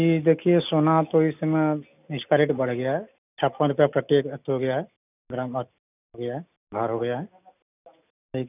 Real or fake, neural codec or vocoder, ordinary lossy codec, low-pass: real; none; none; 3.6 kHz